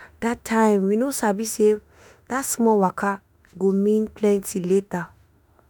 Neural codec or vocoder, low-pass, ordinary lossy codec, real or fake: autoencoder, 48 kHz, 32 numbers a frame, DAC-VAE, trained on Japanese speech; none; none; fake